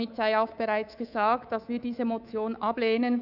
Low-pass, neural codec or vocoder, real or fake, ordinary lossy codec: 5.4 kHz; codec, 24 kHz, 3.1 kbps, DualCodec; fake; none